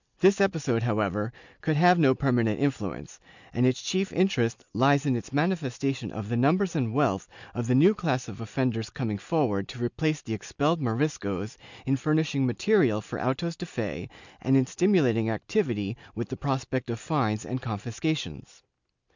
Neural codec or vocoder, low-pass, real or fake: none; 7.2 kHz; real